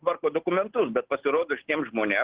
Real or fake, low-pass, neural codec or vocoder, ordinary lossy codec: real; 3.6 kHz; none; Opus, 16 kbps